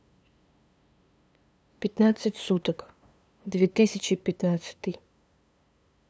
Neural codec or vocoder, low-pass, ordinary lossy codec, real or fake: codec, 16 kHz, 2 kbps, FunCodec, trained on LibriTTS, 25 frames a second; none; none; fake